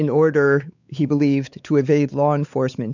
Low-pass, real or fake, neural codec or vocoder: 7.2 kHz; fake; codec, 16 kHz, 4 kbps, X-Codec, WavLM features, trained on Multilingual LibriSpeech